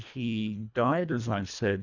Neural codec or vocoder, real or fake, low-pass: codec, 24 kHz, 1.5 kbps, HILCodec; fake; 7.2 kHz